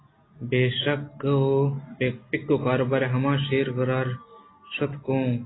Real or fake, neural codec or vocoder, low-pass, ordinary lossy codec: real; none; 7.2 kHz; AAC, 16 kbps